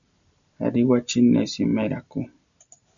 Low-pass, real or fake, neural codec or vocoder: 7.2 kHz; real; none